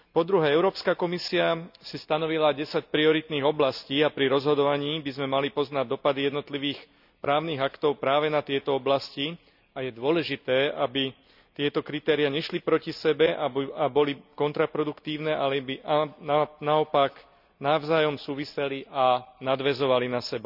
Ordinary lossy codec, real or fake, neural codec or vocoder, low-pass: none; real; none; 5.4 kHz